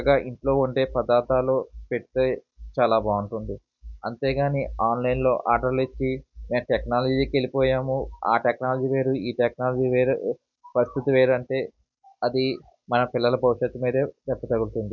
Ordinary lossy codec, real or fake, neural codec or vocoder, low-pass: none; real; none; 7.2 kHz